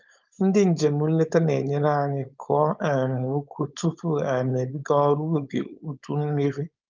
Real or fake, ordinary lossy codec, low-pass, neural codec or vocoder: fake; Opus, 32 kbps; 7.2 kHz; codec, 16 kHz, 4.8 kbps, FACodec